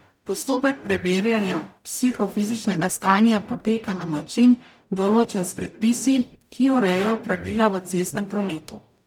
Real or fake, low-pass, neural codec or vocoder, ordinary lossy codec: fake; 19.8 kHz; codec, 44.1 kHz, 0.9 kbps, DAC; none